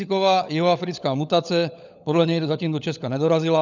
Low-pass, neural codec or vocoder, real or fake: 7.2 kHz; codec, 16 kHz, 16 kbps, FunCodec, trained on LibriTTS, 50 frames a second; fake